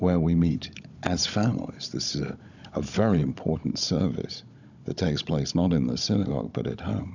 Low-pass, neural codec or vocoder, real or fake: 7.2 kHz; codec, 16 kHz, 16 kbps, FunCodec, trained on Chinese and English, 50 frames a second; fake